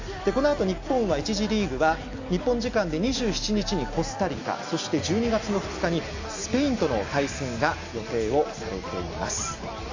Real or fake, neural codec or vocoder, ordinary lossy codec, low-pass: real; none; AAC, 48 kbps; 7.2 kHz